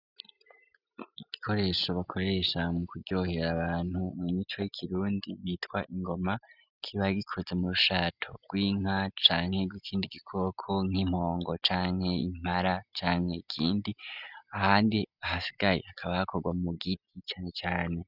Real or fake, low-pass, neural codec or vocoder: real; 5.4 kHz; none